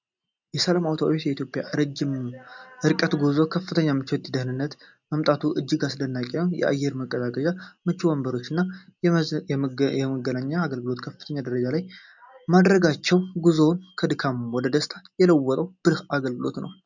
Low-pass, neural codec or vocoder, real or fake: 7.2 kHz; none; real